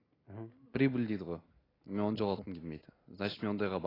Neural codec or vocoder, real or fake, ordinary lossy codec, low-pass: none; real; AAC, 24 kbps; 5.4 kHz